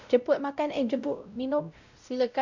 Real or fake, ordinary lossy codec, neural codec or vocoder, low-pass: fake; none; codec, 16 kHz, 0.5 kbps, X-Codec, WavLM features, trained on Multilingual LibriSpeech; 7.2 kHz